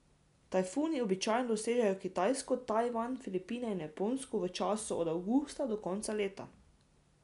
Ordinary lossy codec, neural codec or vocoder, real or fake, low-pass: none; none; real; 10.8 kHz